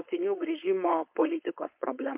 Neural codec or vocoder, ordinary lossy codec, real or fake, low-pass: codec, 16 kHz, 4.8 kbps, FACodec; MP3, 32 kbps; fake; 3.6 kHz